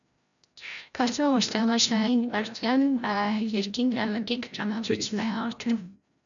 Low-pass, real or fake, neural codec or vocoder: 7.2 kHz; fake; codec, 16 kHz, 0.5 kbps, FreqCodec, larger model